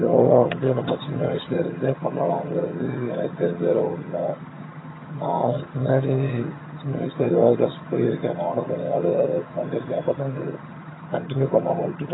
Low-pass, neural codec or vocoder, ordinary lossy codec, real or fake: 7.2 kHz; vocoder, 22.05 kHz, 80 mel bands, HiFi-GAN; AAC, 16 kbps; fake